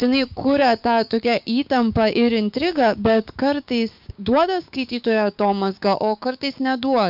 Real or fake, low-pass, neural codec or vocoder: fake; 5.4 kHz; codec, 16 kHz, 6 kbps, DAC